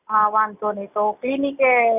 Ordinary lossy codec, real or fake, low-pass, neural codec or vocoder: none; real; 3.6 kHz; none